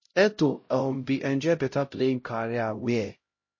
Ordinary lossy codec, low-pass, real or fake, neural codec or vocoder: MP3, 32 kbps; 7.2 kHz; fake; codec, 16 kHz, 0.5 kbps, X-Codec, HuBERT features, trained on LibriSpeech